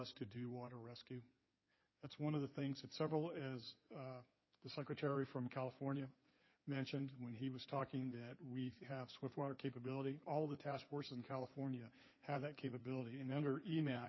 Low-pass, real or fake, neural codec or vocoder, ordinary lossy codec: 7.2 kHz; fake; codec, 16 kHz in and 24 kHz out, 2.2 kbps, FireRedTTS-2 codec; MP3, 24 kbps